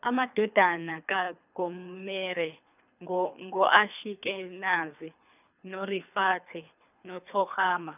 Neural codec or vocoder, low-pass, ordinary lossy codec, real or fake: codec, 24 kHz, 3 kbps, HILCodec; 3.6 kHz; none; fake